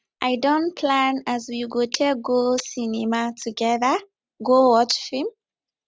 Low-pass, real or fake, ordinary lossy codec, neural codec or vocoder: none; real; none; none